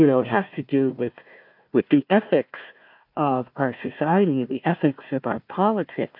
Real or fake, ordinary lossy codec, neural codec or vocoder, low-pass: fake; MP3, 48 kbps; codec, 16 kHz, 1 kbps, FunCodec, trained on Chinese and English, 50 frames a second; 5.4 kHz